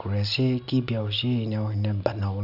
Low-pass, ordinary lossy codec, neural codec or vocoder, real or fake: 5.4 kHz; none; none; real